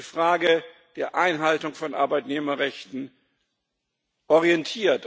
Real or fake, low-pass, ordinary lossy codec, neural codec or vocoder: real; none; none; none